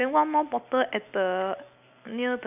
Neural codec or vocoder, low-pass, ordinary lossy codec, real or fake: none; 3.6 kHz; none; real